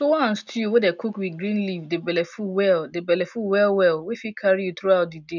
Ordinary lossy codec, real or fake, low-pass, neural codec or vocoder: none; real; 7.2 kHz; none